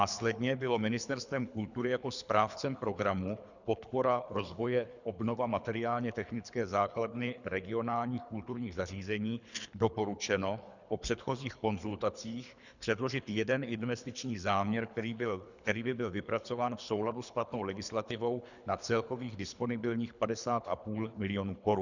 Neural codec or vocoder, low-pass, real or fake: codec, 24 kHz, 3 kbps, HILCodec; 7.2 kHz; fake